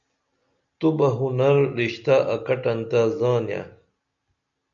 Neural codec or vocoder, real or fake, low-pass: none; real; 7.2 kHz